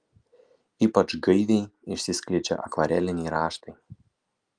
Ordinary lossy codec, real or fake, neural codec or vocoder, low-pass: Opus, 32 kbps; real; none; 9.9 kHz